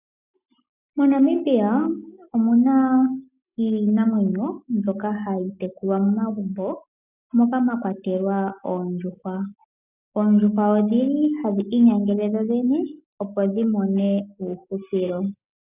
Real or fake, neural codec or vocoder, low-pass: real; none; 3.6 kHz